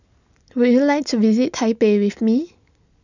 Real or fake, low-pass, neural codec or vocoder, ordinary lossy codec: real; 7.2 kHz; none; none